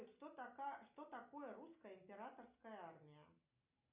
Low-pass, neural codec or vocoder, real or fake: 3.6 kHz; none; real